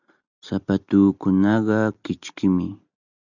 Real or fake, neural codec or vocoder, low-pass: real; none; 7.2 kHz